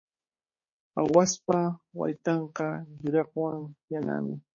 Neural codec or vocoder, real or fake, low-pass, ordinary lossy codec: codec, 16 kHz, 4 kbps, X-Codec, HuBERT features, trained on balanced general audio; fake; 7.2 kHz; MP3, 32 kbps